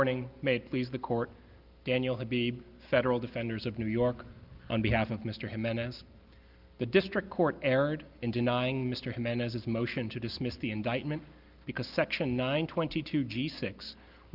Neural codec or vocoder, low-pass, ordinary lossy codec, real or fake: none; 5.4 kHz; Opus, 24 kbps; real